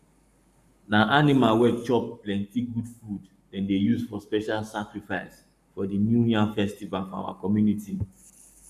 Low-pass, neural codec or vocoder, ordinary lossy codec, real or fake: 14.4 kHz; codec, 44.1 kHz, 7.8 kbps, Pupu-Codec; none; fake